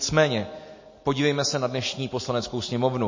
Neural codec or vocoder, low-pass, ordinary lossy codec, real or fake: none; 7.2 kHz; MP3, 32 kbps; real